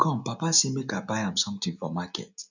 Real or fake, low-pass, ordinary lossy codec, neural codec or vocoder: real; 7.2 kHz; none; none